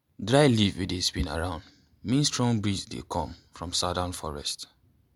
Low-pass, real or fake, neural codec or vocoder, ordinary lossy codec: 19.8 kHz; real; none; MP3, 96 kbps